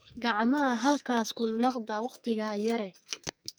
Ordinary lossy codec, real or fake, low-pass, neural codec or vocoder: none; fake; none; codec, 44.1 kHz, 2.6 kbps, SNAC